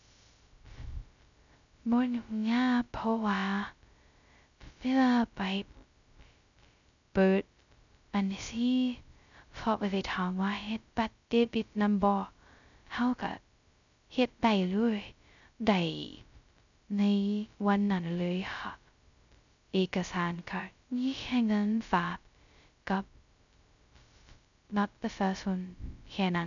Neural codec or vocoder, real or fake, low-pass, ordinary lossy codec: codec, 16 kHz, 0.2 kbps, FocalCodec; fake; 7.2 kHz; none